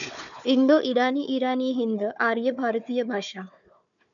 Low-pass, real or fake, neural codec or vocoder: 7.2 kHz; fake; codec, 16 kHz, 4 kbps, FunCodec, trained on Chinese and English, 50 frames a second